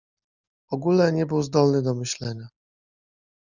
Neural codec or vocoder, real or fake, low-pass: none; real; 7.2 kHz